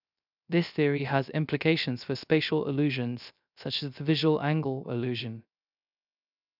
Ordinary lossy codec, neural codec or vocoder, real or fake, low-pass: none; codec, 16 kHz, 0.3 kbps, FocalCodec; fake; 5.4 kHz